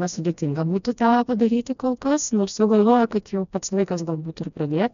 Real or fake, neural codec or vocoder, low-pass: fake; codec, 16 kHz, 1 kbps, FreqCodec, smaller model; 7.2 kHz